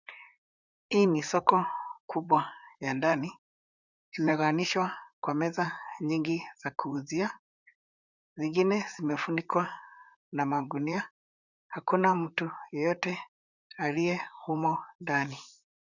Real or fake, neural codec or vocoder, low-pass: fake; vocoder, 44.1 kHz, 128 mel bands, Pupu-Vocoder; 7.2 kHz